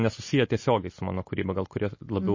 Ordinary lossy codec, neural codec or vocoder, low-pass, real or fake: MP3, 32 kbps; none; 7.2 kHz; real